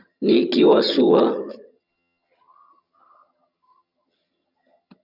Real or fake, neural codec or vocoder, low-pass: fake; vocoder, 22.05 kHz, 80 mel bands, HiFi-GAN; 5.4 kHz